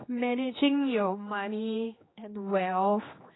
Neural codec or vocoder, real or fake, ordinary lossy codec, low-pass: codec, 16 kHz, 2 kbps, X-Codec, HuBERT features, trained on general audio; fake; AAC, 16 kbps; 7.2 kHz